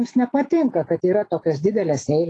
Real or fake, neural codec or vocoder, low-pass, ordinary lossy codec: fake; autoencoder, 48 kHz, 128 numbers a frame, DAC-VAE, trained on Japanese speech; 10.8 kHz; AAC, 32 kbps